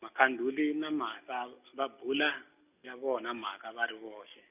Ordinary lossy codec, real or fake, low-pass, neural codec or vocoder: none; real; 3.6 kHz; none